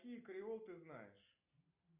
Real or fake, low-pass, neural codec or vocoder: real; 3.6 kHz; none